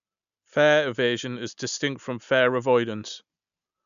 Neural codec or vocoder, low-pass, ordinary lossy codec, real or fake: none; 7.2 kHz; none; real